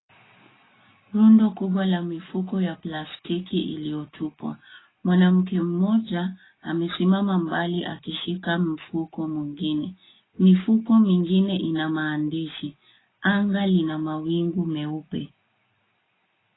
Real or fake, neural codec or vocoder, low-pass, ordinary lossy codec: real; none; 7.2 kHz; AAC, 16 kbps